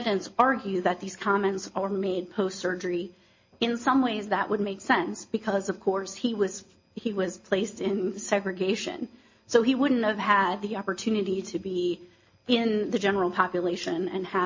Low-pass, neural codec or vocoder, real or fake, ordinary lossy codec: 7.2 kHz; none; real; MP3, 32 kbps